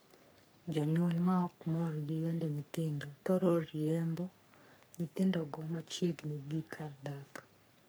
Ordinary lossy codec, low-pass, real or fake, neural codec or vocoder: none; none; fake; codec, 44.1 kHz, 3.4 kbps, Pupu-Codec